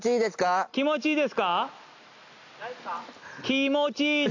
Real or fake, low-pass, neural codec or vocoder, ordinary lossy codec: real; 7.2 kHz; none; AAC, 48 kbps